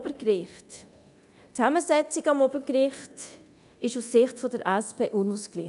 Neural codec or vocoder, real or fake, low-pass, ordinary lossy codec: codec, 24 kHz, 0.9 kbps, DualCodec; fake; 10.8 kHz; none